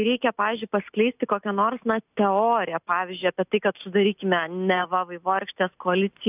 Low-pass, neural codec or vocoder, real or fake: 3.6 kHz; none; real